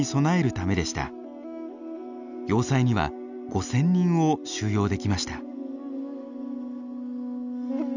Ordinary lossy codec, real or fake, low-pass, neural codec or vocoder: none; real; 7.2 kHz; none